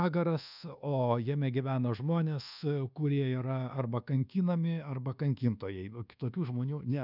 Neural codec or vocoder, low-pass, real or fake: codec, 24 kHz, 1.2 kbps, DualCodec; 5.4 kHz; fake